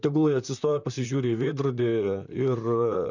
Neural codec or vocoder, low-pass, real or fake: vocoder, 44.1 kHz, 128 mel bands, Pupu-Vocoder; 7.2 kHz; fake